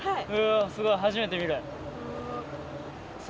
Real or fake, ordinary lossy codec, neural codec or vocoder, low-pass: real; none; none; none